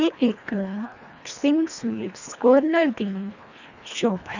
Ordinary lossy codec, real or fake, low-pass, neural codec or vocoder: none; fake; 7.2 kHz; codec, 24 kHz, 1.5 kbps, HILCodec